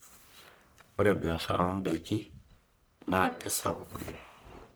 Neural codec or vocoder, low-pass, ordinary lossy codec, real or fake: codec, 44.1 kHz, 1.7 kbps, Pupu-Codec; none; none; fake